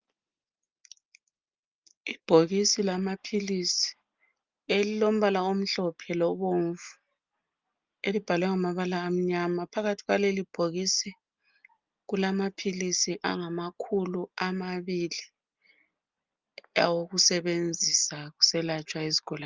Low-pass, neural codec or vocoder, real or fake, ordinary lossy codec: 7.2 kHz; none; real; Opus, 24 kbps